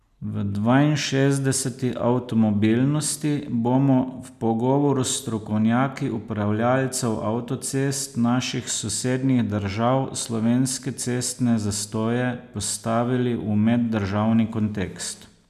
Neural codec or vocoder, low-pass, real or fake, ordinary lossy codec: none; 14.4 kHz; real; none